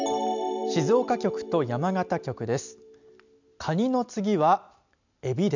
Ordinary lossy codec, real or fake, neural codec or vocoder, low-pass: none; real; none; 7.2 kHz